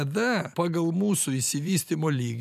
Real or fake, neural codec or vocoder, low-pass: fake; vocoder, 44.1 kHz, 128 mel bands every 512 samples, BigVGAN v2; 14.4 kHz